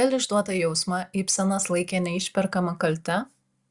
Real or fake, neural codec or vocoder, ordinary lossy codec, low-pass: real; none; Opus, 64 kbps; 10.8 kHz